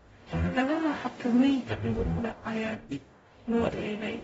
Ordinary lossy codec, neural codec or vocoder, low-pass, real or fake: AAC, 24 kbps; codec, 44.1 kHz, 0.9 kbps, DAC; 19.8 kHz; fake